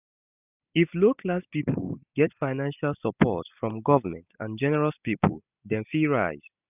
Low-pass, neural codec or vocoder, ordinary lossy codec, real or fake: 3.6 kHz; none; none; real